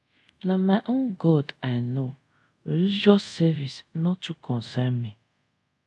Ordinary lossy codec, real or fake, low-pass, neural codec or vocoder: none; fake; none; codec, 24 kHz, 0.5 kbps, DualCodec